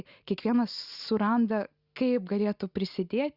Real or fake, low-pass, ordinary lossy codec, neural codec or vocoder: real; 5.4 kHz; Opus, 64 kbps; none